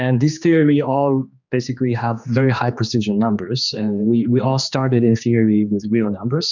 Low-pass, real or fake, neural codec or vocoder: 7.2 kHz; fake; codec, 16 kHz, 2 kbps, X-Codec, HuBERT features, trained on general audio